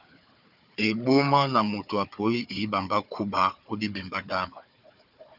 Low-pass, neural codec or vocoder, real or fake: 5.4 kHz; codec, 16 kHz, 4 kbps, FunCodec, trained on Chinese and English, 50 frames a second; fake